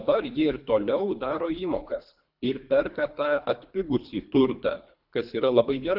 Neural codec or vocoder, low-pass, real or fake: codec, 24 kHz, 3 kbps, HILCodec; 5.4 kHz; fake